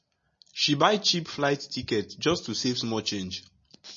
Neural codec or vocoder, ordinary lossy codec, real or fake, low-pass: none; MP3, 32 kbps; real; 7.2 kHz